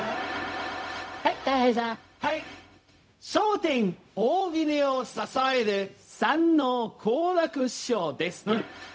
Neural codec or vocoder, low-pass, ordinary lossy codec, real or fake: codec, 16 kHz, 0.4 kbps, LongCat-Audio-Codec; none; none; fake